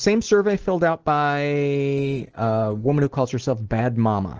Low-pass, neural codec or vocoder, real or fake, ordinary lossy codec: 7.2 kHz; none; real; Opus, 16 kbps